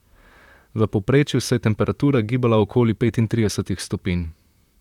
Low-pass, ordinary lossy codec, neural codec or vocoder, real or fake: 19.8 kHz; none; vocoder, 44.1 kHz, 128 mel bands, Pupu-Vocoder; fake